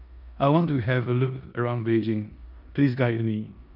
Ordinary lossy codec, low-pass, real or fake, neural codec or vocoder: none; 5.4 kHz; fake; codec, 16 kHz in and 24 kHz out, 0.9 kbps, LongCat-Audio-Codec, four codebook decoder